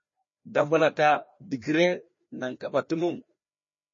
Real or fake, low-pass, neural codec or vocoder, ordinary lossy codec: fake; 7.2 kHz; codec, 16 kHz, 1 kbps, FreqCodec, larger model; MP3, 32 kbps